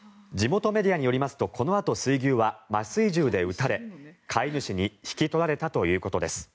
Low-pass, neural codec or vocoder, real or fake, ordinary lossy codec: none; none; real; none